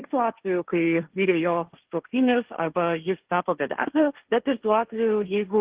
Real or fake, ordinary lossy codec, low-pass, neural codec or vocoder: fake; Opus, 16 kbps; 3.6 kHz; codec, 16 kHz, 1.1 kbps, Voila-Tokenizer